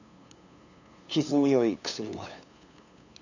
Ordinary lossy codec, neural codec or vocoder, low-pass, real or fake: none; codec, 16 kHz, 2 kbps, FunCodec, trained on LibriTTS, 25 frames a second; 7.2 kHz; fake